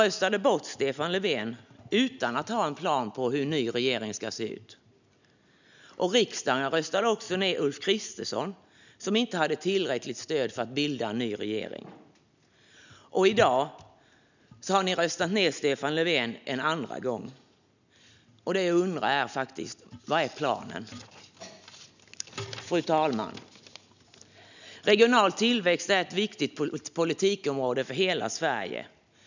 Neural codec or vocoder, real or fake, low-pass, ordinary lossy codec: none; real; 7.2 kHz; none